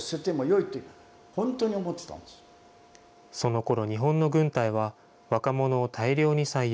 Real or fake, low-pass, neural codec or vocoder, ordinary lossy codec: real; none; none; none